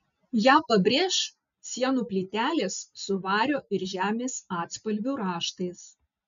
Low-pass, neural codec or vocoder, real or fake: 7.2 kHz; none; real